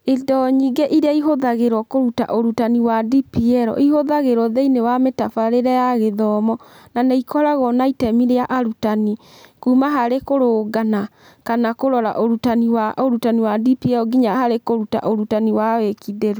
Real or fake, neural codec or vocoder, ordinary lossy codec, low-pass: real; none; none; none